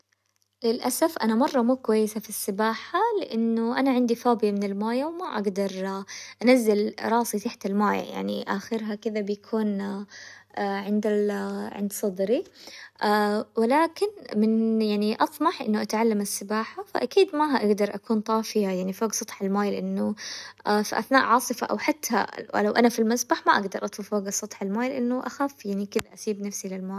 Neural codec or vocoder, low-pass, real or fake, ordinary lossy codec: none; 14.4 kHz; real; none